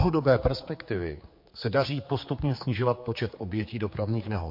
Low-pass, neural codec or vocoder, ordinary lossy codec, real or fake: 5.4 kHz; codec, 16 kHz, 4 kbps, X-Codec, HuBERT features, trained on general audio; MP3, 32 kbps; fake